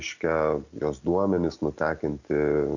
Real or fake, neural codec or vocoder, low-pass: real; none; 7.2 kHz